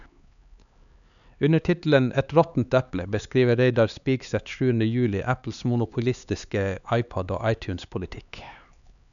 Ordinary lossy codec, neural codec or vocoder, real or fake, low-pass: none; codec, 16 kHz, 4 kbps, X-Codec, HuBERT features, trained on LibriSpeech; fake; 7.2 kHz